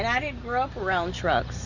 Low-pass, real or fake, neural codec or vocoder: 7.2 kHz; real; none